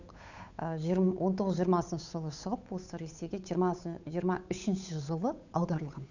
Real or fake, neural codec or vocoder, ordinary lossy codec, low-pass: fake; codec, 16 kHz, 8 kbps, FunCodec, trained on Chinese and English, 25 frames a second; none; 7.2 kHz